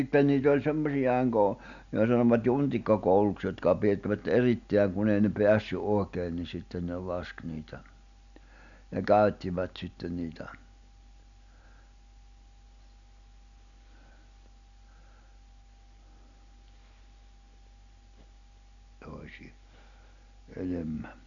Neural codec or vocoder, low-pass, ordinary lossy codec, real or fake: none; 7.2 kHz; none; real